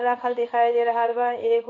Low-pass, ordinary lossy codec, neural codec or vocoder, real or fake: 7.2 kHz; none; codec, 16 kHz in and 24 kHz out, 1 kbps, XY-Tokenizer; fake